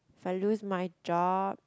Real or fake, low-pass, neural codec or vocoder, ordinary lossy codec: real; none; none; none